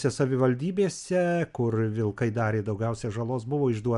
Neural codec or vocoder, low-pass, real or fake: none; 10.8 kHz; real